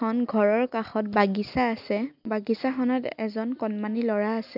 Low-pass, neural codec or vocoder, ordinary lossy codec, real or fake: 5.4 kHz; none; none; real